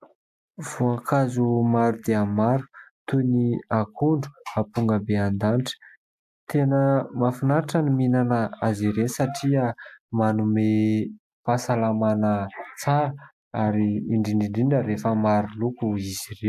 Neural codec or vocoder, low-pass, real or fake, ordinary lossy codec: none; 14.4 kHz; real; AAC, 96 kbps